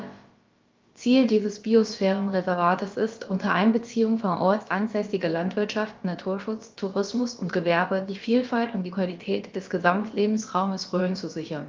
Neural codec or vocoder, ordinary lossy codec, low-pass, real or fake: codec, 16 kHz, about 1 kbps, DyCAST, with the encoder's durations; Opus, 32 kbps; 7.2 kHz; fake